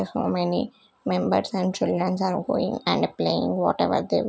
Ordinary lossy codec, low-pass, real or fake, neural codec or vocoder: none; none; real; none